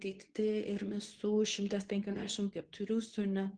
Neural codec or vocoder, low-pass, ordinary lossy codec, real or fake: codec, 24 kHz, 0.9 kbps, WavTokenizer, medium speech release version 2; 9.9 kHz; Opus, 16 kbps; fake